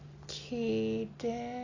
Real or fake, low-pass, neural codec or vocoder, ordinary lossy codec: real; 7.2 kHz; none; AAC, 32 kbps